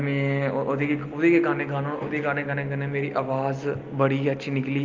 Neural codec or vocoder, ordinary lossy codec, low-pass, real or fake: none; Opus, 32 kbps; 7.2 kHz; real